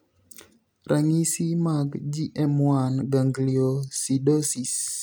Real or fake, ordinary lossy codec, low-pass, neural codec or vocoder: real; none; none; none